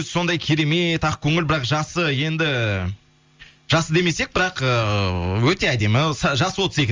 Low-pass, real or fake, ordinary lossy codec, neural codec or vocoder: 7.2 kHz; real; Opus, 32 kbps; none